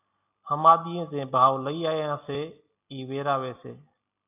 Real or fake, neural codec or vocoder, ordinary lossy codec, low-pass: real; none; AAC, 32 kbps; 3.6 kHz